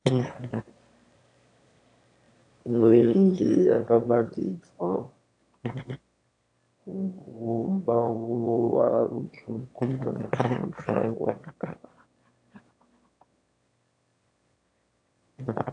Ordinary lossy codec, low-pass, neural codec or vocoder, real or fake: AAC, 48 kbps; 9.9 kHz; autoencoder, 22.05 kHz, a latent of 192 numbers a frame, VITS, trained on one speaker; fake